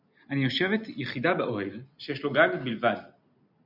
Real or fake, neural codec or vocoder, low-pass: real; none; 5.4 kHz